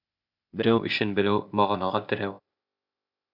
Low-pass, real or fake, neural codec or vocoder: 5.4 kHz; fake; codec, 16 kHz, 0.8 kbps, ZipCodec